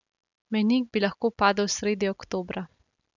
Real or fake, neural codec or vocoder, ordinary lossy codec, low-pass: real; none; none; 7.2 kHz